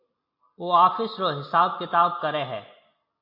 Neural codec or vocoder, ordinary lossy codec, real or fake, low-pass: none; MP3, 32 kbps; real; 5.4 kHz